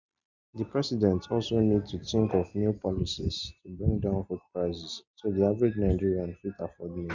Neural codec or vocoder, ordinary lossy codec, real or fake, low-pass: none; none; real; 7.2 kHz